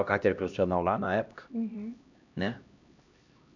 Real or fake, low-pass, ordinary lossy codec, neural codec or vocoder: fake; 7.2 kHz; none; codec, 16 kHz, 1 kbps, X-Codec, HuBERT features, trained on LibriSpeech